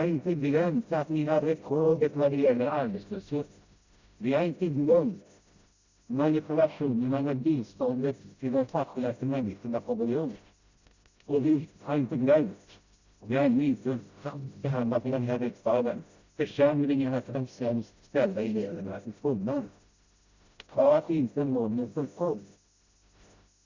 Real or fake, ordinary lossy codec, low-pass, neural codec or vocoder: fake; Opus, 64 kbps; 7.2 kHz; codec, 16 kHz, 0.5 kbps, FreqCodec, smaller model